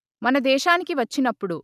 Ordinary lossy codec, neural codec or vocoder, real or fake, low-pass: none; none; real; 14.4 kHz